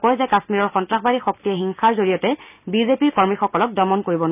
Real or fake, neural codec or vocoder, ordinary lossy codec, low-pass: real; none; none; 3.6 kHz